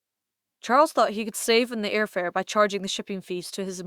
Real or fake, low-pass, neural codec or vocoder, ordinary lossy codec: fake; 19.8 kHz; autoencoder, 48 kHz, 128 numbers a frame, DAC-VAE, trained on Japanese speech; Opus, 64 kbps